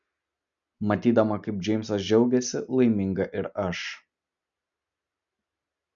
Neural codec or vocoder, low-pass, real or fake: none; 7.2 kHz; real